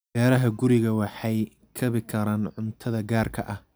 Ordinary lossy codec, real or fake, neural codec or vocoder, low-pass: none; real; none; none